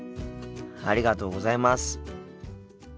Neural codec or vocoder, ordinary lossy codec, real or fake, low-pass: none; none; real; none